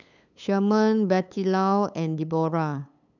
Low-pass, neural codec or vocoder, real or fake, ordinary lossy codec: 7.2 kHz; codec, 16 kHz, 8 kbps, FunCodec, trained on LibriTTS, 25 frames a second; fake; none